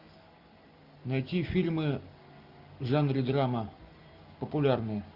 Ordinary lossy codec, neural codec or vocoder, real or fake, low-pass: Opus, 64 kbps; none; real; 5.4 kHz